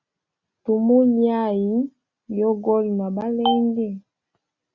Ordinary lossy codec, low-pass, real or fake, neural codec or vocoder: Opus, 64 kbps; 7.2 kHz; real; none